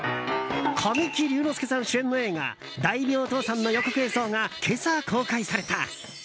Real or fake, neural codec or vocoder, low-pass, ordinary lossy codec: real; none; none; none